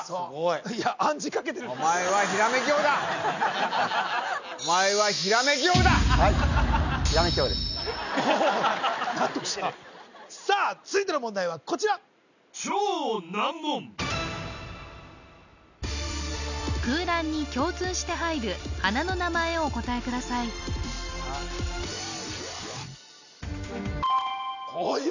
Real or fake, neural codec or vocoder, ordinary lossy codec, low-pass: real; none; none; 7.2 kHz